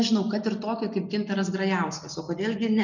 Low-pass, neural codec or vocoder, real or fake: 7.2 kHz; none; real